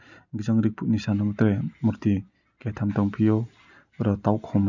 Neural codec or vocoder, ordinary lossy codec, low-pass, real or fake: none; none; 7.2 kHz; real